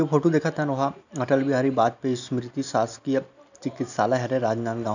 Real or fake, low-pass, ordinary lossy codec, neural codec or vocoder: real; 7.2 kHz; none; none